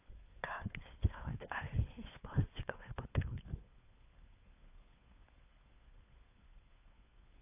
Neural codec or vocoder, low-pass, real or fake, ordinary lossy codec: codec, 16 kHz, 4 kbps, FunCodec, trained on LibriTTS, 50 frames a second; 3.6 kHz; fake; AAC, 24 kbps